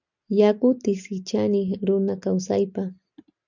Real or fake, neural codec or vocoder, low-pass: real; none; 7.2 kHz